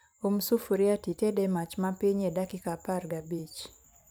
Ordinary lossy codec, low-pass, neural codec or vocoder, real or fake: none; none; vocoder, 44.1 kHz, 128 mel bands every 512 samples, BigVGAN v2; fake